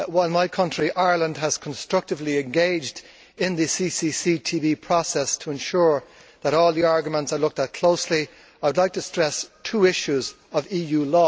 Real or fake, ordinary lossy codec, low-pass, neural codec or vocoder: real; none; none; none